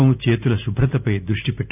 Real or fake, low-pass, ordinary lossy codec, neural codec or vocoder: real; 3.6 kHz; none; none